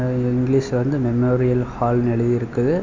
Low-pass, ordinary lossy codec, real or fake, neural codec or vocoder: 7.2 kHz; MP3, 64 kbps; real; none